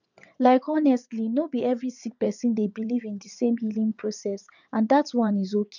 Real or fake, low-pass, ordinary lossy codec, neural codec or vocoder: fake; 7.2 kHz; none; vocoder, 22.05 kHz, 80 mel bands, WaveNeXt